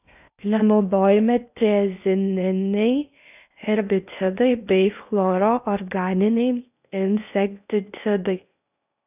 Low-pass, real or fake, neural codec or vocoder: 3.6 kHz; fake; codec, 16 kHz in and 24 kHz out, 0.6 kbps, FocalCodec, streaming, 2048 codes